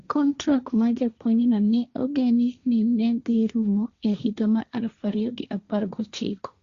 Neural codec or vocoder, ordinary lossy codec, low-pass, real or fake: codec, 16 kHz, 1.1 kbps, Voila-Tokenizer; Opus, 64 kbps; 7.2 kHz; fake